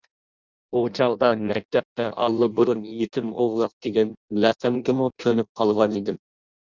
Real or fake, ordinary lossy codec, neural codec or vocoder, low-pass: fake; Opus, 64 kbps; codec, 16 kHz in and 24 kHz out, 0.6 kbps, FireRedTTS-2 codec; 7.2 kHz